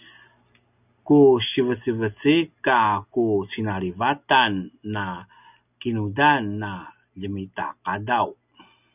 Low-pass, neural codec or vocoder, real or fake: 3.6 kHz; none; real